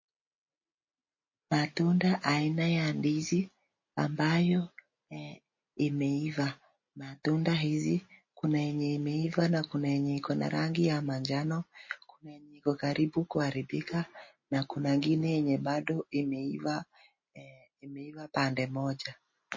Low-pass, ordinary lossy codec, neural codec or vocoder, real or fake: 7.2 kHz; MP3, 32 kbps; none; real